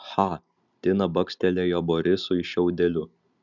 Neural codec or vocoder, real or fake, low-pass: none; real; 7.2 kHz